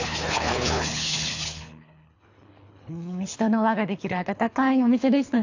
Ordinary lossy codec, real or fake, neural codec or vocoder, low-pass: AAC, 48 kbps; fake; codec, 24 kHz, 3 kbps, HILCodec; 7.2 kHz